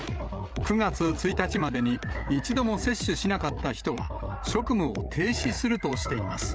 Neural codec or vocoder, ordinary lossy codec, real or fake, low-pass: codec, 16 kHz, 8 kbps, FreqCodec, larger model; none; fake; none